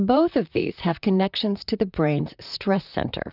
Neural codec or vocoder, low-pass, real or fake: vocoder, 44.1 kHz, 128 mel bands, Pupu-Vocoder; 5.4 kHz; fake